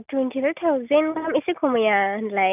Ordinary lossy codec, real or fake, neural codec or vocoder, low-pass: none; real; none; 3.6 kHz